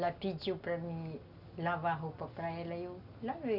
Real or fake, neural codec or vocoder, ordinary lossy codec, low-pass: real; none; none; 5.4 kHz